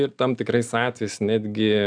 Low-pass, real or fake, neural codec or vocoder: 9.9 kHz; real; none